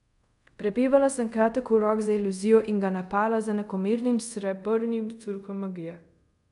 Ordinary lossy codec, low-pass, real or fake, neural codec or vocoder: none; 10.8 kHz; fake; codec, 24 kHz, 0.5 kbps, DualCodec